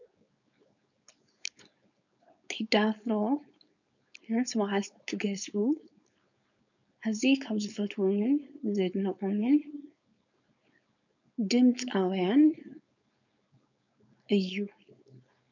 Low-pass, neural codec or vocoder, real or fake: 7.2 kHz; codec, 16 kHz, 4.8 kbps, FACodec; fake